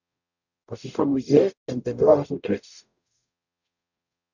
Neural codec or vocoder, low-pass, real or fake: codec, 44.1 kHz, 0.9 kbps, DAC; 7.2 kHz; fake